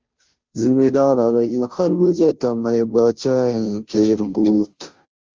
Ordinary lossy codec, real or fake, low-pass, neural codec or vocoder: Opus, 32 kbps; fake; 7.2 kHz; codec, 16 kHz, 0.5 kbps, FunCodec, trained on Chinese and English, 25 frames a second